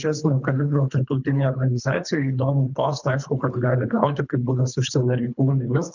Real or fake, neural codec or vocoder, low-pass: fake; codec, 24 kHz, 3 kbps, HILCodec; 7.2 kHz